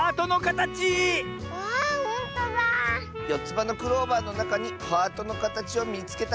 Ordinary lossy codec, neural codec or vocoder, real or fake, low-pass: none; none; real; none